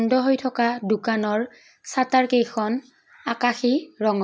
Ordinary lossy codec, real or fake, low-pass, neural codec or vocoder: none; real; none; none